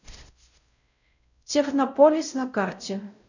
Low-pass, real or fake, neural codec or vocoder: 7.2 kHz; fake; codec, 16 kHz, 0.5 kbps, X-Codec, WavLM features, trained on Multilingual LibriSpeech